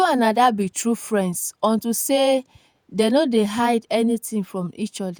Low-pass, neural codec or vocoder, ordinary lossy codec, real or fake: none; vocoder, 48 kHz, 128 mel bands, Vocos; none; fake